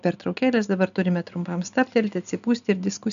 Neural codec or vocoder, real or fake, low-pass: none; real; 7.2 kHz